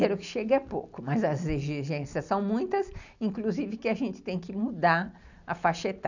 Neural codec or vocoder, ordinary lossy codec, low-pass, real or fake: none; none; 7.2 kHz; real